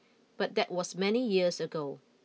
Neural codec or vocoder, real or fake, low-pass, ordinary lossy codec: none; real; none; none